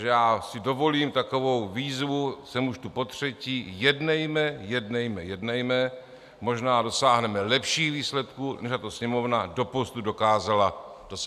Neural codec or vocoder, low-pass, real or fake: none; 14.4 kHz; real